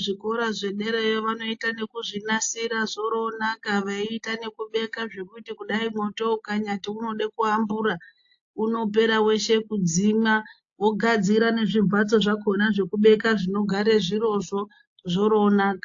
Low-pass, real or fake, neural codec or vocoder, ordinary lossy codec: 7.2 kHz; real; none; AAC, 48 kbps